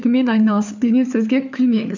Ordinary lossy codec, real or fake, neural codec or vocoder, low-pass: none; fake; codec, 16 kHz, 4 kbps, FunCodec, trained on LibriTTS, 50 frames a second; 7.2 kHz